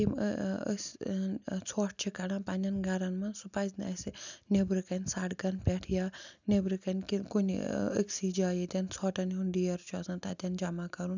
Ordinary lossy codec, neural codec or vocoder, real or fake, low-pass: none; none; real; 7.2 kHz